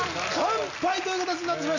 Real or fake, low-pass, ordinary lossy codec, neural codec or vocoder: real; 7.2 kHz; none; none